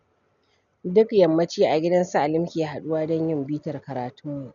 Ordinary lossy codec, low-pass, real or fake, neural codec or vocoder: none; 7.2 kHz; real; none